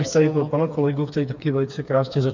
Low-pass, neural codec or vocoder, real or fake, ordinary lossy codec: 7.2 kHz; codec, 16 kHz, 4 kbps, FreqCodec, smaller model; fake; MP3, 64 kbps